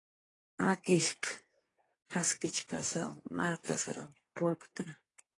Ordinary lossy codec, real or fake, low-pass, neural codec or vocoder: AAC, 32 kbps; fake; 10.8 kHz; codec, 24 kHz, 1 kbps, SNAC